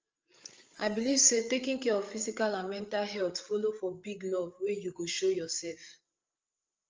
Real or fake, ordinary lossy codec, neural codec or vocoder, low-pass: fake; Opus, 24 kbps; codec, 16 kHz, 8 kbps, FreqCodec, larger model; 7.2 kHz